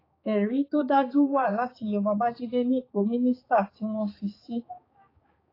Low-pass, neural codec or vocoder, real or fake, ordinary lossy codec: 5.4 kHz; codec, 16 kHz, 4 kbps, X-Codec, HuBERT features, trained on balanced general audio; fake; AAC, 24 kbps